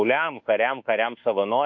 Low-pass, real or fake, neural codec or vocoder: 7.2 kHz; fake; codec, 24 kHz, 1.2 kbps, DualCodec